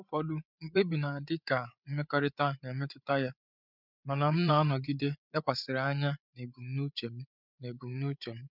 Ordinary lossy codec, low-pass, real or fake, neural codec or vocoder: none; 5.4 kHz; fake; codec, 16 kHz, 8 kbps, FreqCodec, larger model